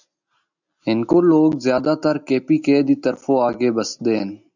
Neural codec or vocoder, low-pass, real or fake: none; 7.2 kHz; real